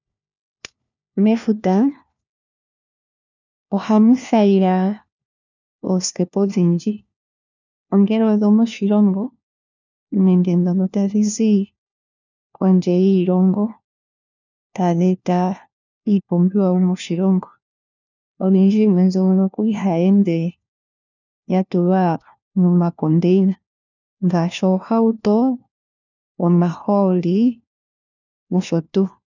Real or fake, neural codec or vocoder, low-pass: fake; codec, 16 kHz, 1 kbps, FunCodec, trained on LibriTTS, 50 frames a second; 7.2 kHz